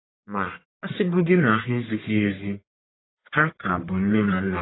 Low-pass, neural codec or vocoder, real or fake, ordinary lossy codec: 7.2 kHz; codec, 44.1 kHz, 1.7 kbps, Pupu-Codec; fake; AAC, 16 kbps